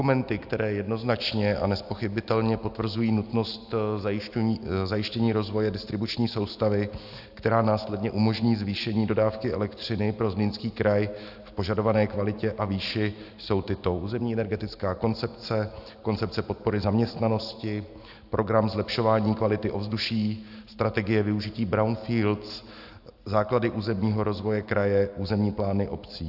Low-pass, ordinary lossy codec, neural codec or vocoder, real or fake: 5.4 kHz; AAC, 48 kbps; none; real